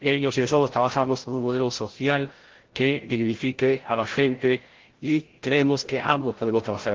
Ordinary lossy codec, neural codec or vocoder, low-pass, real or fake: Opus, 16 kbps; codec, 16 kHz, 0.5 kbps, FreqCodec, larger model; 7.2 kHz; fake